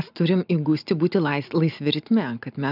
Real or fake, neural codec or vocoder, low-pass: real; none; 5.4 kHz